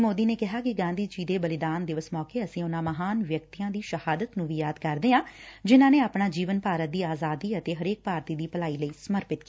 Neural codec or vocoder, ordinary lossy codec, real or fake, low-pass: none; none; real; none